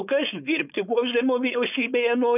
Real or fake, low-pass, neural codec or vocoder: fake; 3.6 kHz; codec, 16 kHz, 4.8 kbps, FACodec